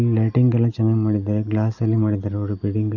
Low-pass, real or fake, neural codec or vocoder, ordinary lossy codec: 7.2 kHz; real; none; none